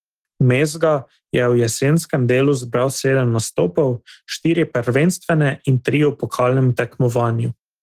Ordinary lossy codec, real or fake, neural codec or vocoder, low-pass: Opus, 16 kbps; fake; autoencoder, 48 kHz, 128 numbers a frame, DAC-VAE, trained on Japanese speech; 14.4 kHz